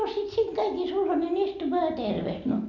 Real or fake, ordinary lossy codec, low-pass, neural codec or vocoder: real; none; 7.2 kHz; none